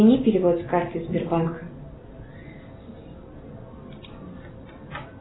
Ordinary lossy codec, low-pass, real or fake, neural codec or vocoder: AAC, 16 kbps; 7.2 kHz; real; none